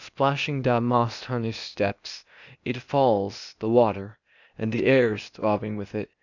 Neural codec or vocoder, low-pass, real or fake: codec, 16 kHz, 0.8 kbps, ZipCodec; 7.2 kHz; fake